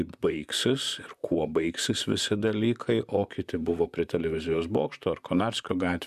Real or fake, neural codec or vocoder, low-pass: fake; vocoder, 44.1 kHz, 128 mel bands, Pupu-Vocoder; 14.4 kHz